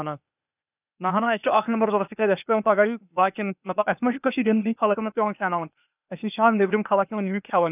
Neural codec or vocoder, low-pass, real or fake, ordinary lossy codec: codec, 16 kHz, 0.8 kbps, ZipCodec; 3.6 kHz; fake; none